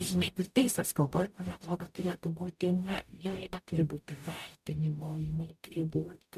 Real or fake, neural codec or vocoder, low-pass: fake; codec, 44.1 kHz, 0.9 kbps, DAC; 14.4 kHz